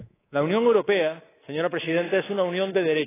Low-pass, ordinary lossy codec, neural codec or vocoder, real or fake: 3.6 kHz; AAC, 16 kbps; codec, 24 kHz, 3.1 kbps, DualCodec; fake